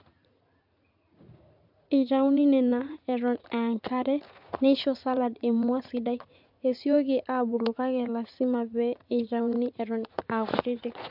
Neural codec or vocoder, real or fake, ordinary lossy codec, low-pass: vocoder, 44.1 kHz, 80 mel bands, Vocos; fake; MP3, 48 kbps; 5.4 kHz